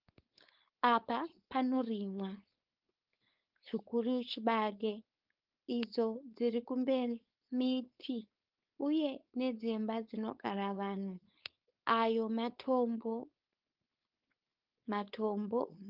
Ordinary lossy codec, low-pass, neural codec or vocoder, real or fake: Opus, 32 kbps; 5.4 kHz; codec, 16 kHz, 4.8 kbps, FACodec; fake